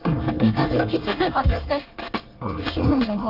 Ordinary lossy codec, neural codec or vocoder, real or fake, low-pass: Opus, 32 kbps; codec, 24 kHz, 1 kbps, SNAC; fake; 5.4 kHz